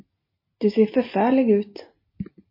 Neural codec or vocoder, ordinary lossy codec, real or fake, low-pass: none; MP3, 24 kbps; real; 5.4 kHz